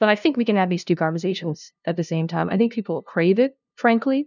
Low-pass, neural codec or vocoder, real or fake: 7.2 kHz; codec, 16 kHz, 0.5 kbps, FunCodec, trained on LibriTTS, 25 frames a second; fake